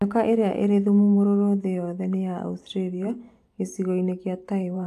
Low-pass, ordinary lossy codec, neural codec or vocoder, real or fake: 14.4 kHz; AAC, 64 kbps; vocoder, 44.1 kHz, 128 mel bands every 512 samples, BigVGAN v2; fake